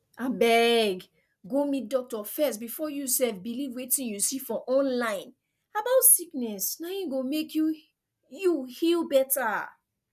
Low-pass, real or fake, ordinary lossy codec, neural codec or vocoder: 14.4 kHz; real; none; none